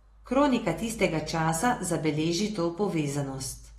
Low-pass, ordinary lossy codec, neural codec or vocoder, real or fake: 19.8 kHz; AAC, 32 kbps; none; real